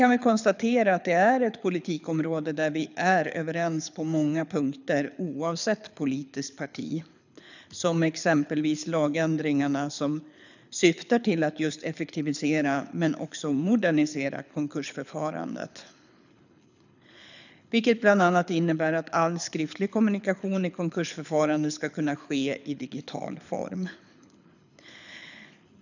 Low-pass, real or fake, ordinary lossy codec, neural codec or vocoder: 7.2 kHz; fake; none; codec, 24 kHz, 6 kbps, HILCodec